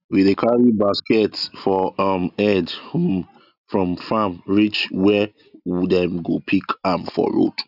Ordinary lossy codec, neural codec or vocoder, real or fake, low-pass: none; none; real; 5.4 kHz